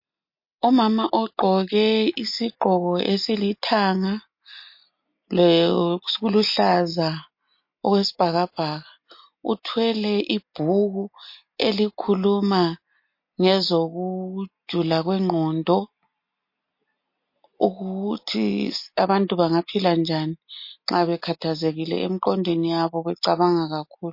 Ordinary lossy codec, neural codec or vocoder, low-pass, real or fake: MP3, 32 kbps; none; 5.4 kHz; real